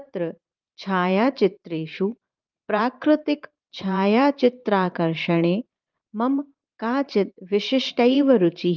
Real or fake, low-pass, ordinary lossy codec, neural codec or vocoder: fake; 7.2 kHz; Opus, 32 kbps; vocoder, 44.1 kHz, 128 mel bands every 512 samples, BigVGAN v2